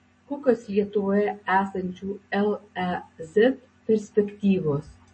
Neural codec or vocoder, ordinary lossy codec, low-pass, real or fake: none; MP3, 32 kbps; 9.9 kHz; real